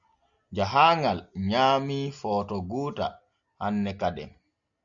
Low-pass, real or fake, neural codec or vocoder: 7.2 kHz; real; none